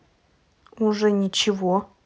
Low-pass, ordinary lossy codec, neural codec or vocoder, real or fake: none; none; none; real